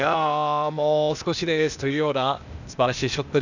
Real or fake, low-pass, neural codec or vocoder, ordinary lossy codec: fake; 7.2 kHz; codec, 16 kHz, 0.8 kbps, ZipCodec; none